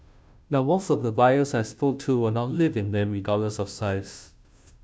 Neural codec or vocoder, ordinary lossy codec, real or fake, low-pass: codec, 16 kHz, 0.5 kbps, FunCodec, trained on Chinese and English, 25 frames a second; none; fake; none